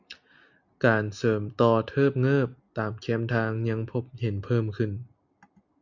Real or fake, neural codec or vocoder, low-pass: real; none; 7.2 kHz